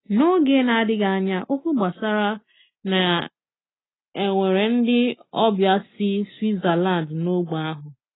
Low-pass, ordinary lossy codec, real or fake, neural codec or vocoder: 7.2 kHz; AAC, 16 kbps; real; none